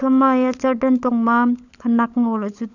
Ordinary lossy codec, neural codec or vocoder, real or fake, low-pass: none; codec, 16 kHz, 16 kbps, FunCodec, trained on LibriTTS, 50 frames a second; fake; 7.2 kHz